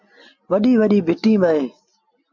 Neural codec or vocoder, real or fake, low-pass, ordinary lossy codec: none; real; 7.2 kHz; MP3, 48 kbps